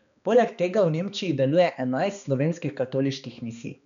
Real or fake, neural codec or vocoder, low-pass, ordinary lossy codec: fake; codec, 16 kHz, 2 kbps, X-Codec, HuBERT features, trained on balanced general audio; 7.2 kHz; none